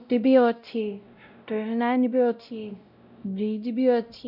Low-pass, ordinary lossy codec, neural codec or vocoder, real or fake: 5.4 kHz; none; codec, 16 kHz, 0.5 kbps, X-Codec, WavLM features, trained on Multilingual LibriSpeech; fake